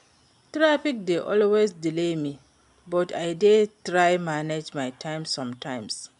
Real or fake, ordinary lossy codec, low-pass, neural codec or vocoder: real; MP3, 96 kbps; 10.8 kHz; none